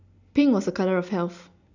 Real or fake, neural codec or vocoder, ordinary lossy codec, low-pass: real; none; none; 7.2 kHz